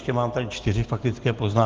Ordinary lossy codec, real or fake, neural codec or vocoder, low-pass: Opus, 16 kbps; real; none; 7.2 kHz